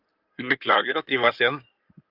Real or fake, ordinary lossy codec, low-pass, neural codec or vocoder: fake; Opus, 24 kbps; 5.4 kHz; codec, 16 kHz in and 24 kHz out, 2.2 kbps, FireRedTTS-2 codec